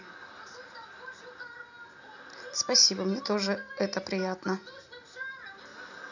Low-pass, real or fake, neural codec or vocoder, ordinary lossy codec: 7.2 kHz; real; none; none